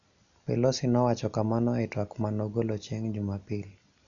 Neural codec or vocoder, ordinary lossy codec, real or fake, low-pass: none; none; real; 7.2 kHz